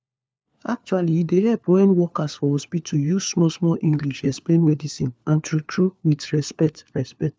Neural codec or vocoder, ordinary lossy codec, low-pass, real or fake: codec, 16 kHz, 4 kbps, FunCodec, trained on LibriTTS, 50 frames a second; none; none; fake